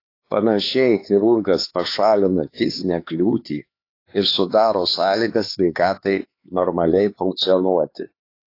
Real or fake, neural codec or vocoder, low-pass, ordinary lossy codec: fake; codec, 16 kHz, 4 kbps, X-Codec, HuBERT features, trained on LibriSpeech; 5.4 kHz; AAC, 32 kbps